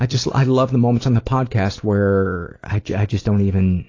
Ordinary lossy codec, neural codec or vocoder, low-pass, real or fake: AAC, 32 kbps; none; 7.2 kHz; real